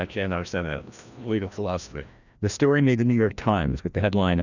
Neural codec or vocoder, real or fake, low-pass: codec, 16 kHz, 1 kbps, FreqCodec, larger model; fake; 7.2 kHz